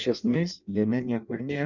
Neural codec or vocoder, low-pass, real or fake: codec, 16 kHz in and 24 kHz out, 0.6 kbps, FireRedTTS-2 codec; 7.2 kHz; fake